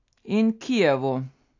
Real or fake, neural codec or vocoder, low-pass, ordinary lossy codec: real; none; 7.2 kHz; AAC, 48 kbps